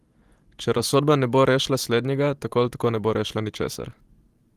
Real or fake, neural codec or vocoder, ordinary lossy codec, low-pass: fake; vocoder, 44.1 kHz, 128 mel bands every 512 samples, BigVGAN v2; Opus, 24 kbps; 14.4 kHz